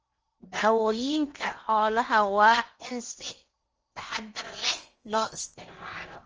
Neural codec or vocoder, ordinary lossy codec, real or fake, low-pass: codec, 16 kHz in and 24 kHz out, 0.8 kbps, FocalCodec, streaming, 65536 codes; Opus, 24 kbps; fake; 7.2 kHz